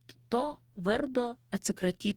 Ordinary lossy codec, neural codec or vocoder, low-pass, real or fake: Opus, 32 kbps; codec, 44.1 kHz, 2.6 kbps, DAC; 19.8 kHz; fake